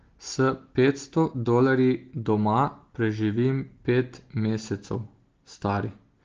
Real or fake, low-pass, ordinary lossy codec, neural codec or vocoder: real; 7.2 kHz; Opus, 16 kbps; none